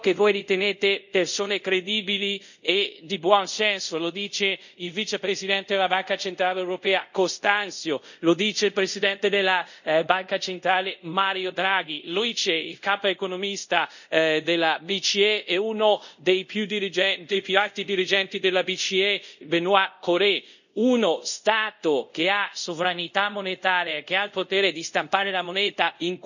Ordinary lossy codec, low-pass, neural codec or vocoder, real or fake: none; 7.2 kHz; codec, 24 kHz, 0.5 kbps, DualCodec; fake